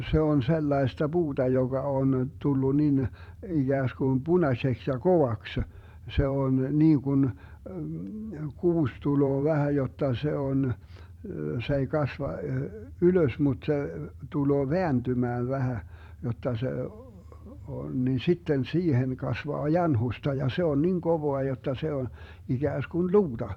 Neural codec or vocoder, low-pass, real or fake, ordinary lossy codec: vocoder, 44.1 kHz, 128 mel bands every 512 samples, BigVGAN v2; 19.8 kHz; fake; none